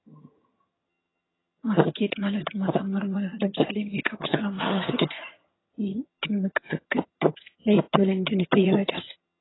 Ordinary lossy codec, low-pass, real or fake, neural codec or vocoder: AAC, 16 kbps; 7.2 kHz; fake; vocoder, 22.05 kHz, 80 mel bands, HiFi-GAN